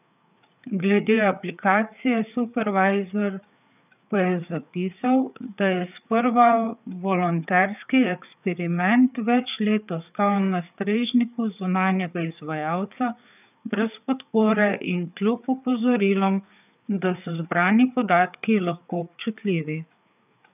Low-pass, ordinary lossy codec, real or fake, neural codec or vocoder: 3.6 kHz; none; fake; codec, 16 kHz, 4 kbps, FreqCodec, larger model